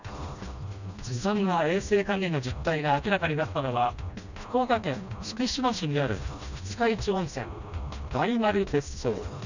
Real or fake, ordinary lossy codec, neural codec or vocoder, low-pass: fake; none; codec, 16 kHz, 1 kbps, FreqCodec, smaller model; 7.2 kHz